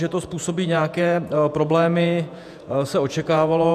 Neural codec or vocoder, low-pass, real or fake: vocoder, 48 kHz, 128 mel bands, Vocos; 14.4 kHz; fake